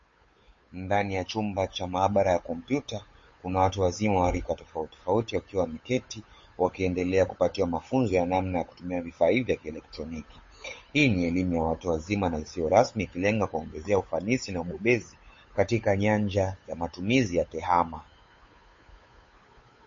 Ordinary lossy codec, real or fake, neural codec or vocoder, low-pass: MP3, 32 kbps; fake; codec, 16 kHz, 8 kbps, FunCodec, trained on Chinese and English, 25 frames a second; 7.2 kHz